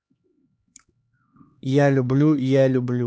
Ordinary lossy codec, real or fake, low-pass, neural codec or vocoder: none; fake; none; codec, 16 kHz, 2 kbps, X-Codec, HuBERT features, trained on LibriSpeech